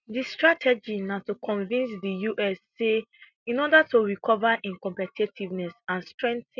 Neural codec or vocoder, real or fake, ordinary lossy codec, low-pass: none; real; none; 7.2 kHz